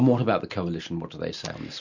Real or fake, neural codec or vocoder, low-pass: real; none; 7.2 kHz